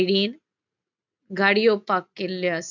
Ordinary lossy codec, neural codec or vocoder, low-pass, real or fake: none; none; 7.2 kHz; real